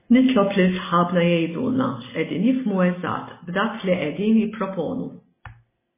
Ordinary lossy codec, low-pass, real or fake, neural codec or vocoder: MP3, 16 kbps; 3.6 kHz; real; none